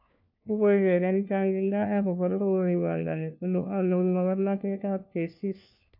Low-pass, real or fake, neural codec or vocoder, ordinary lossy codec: 5.4 kHz; fake; codec, 16 kHz, 1 kbps, FunCodec, trained on LibriTTS, 50 frames a second; AAC, 48 kbps